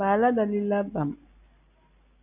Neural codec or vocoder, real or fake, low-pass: none; real; 3.6 kHz